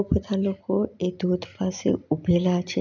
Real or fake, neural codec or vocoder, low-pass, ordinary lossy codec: real; none; 7.2 kHz; none